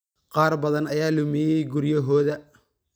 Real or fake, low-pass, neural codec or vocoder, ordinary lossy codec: real; none; none; none